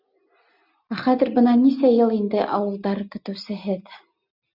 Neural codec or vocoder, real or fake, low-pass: none; real; 5.4 kHz